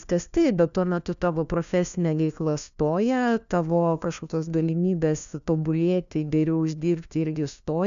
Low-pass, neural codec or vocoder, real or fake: 7.2 kHz; codec, 16 kHz, 1 kbps, FunCodec, trained on LibriTTS, 50 frames a second; fake